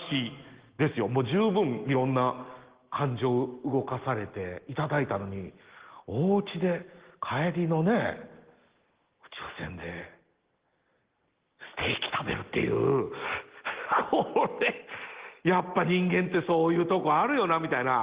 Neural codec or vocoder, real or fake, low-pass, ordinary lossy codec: none; real; 3.6 kHz; Opus, 16 kbps